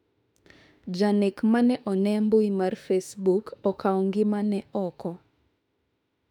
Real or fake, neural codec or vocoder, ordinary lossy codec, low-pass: fake; autoencoder, 48 kHz, 32 numbers a frame, DAC-VAE, trained on Japanese speech; none; 19.8 kHz